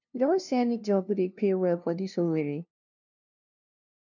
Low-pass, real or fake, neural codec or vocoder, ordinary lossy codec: 7.2 kHz; fake; codec, 16 kHz, 0.5 kbps, FunCodec, trained on LibriTTS, 25 frames a second; none